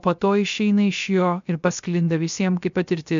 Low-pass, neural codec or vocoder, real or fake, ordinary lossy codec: 7.2 kHz; codec, 16 kHz, 0.3 kbps, FocalCodec; fake; MP3, 64 kbps